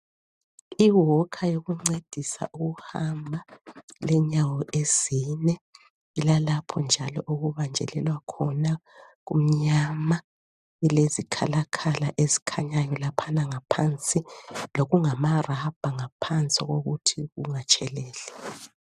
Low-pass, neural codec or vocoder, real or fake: 14.4 kHz; none; real